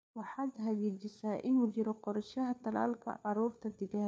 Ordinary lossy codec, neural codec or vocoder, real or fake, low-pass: none; codec, 16 kHz, 4 kbps, FunCodec, trained on LibriTTS, 50 frames a second; fake; none